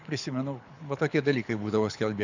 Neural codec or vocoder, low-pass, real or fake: codec, 24 kHz, 6 kbps, HILCodec; 7.2 kHz; fake